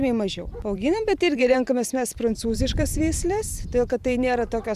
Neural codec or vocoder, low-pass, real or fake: vocoder, 44.1 kHz, 128 mel bands every 512 samples, BigVGAN v2; 14.4 kHz; fake